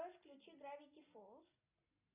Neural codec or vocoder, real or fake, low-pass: none; real; 3.6 kHz